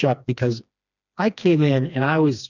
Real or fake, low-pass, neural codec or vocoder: fake; 7.2 kHz; codec, 16 kHz, 2 kbps, FreqCodec, smaller model